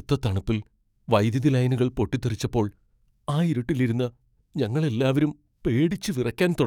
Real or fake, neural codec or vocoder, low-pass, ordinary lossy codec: fake; codec, 44.1 kHz, 7.8 kbps, DAC; 19.8 kHz; none